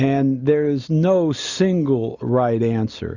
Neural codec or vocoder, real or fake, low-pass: none; real; 7.2 kHz